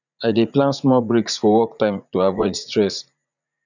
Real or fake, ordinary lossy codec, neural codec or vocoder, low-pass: fake; none; vocoder, 44.1 kHz, 80 mel bands, Vocos; 7.2 kHz